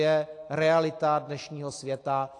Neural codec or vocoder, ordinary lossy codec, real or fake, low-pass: none; AAC, 48 kbps; real; 10.8 kHz